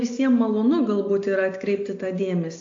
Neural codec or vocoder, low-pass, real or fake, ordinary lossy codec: none; 7.2 kHz; real; AAC, 48 kbps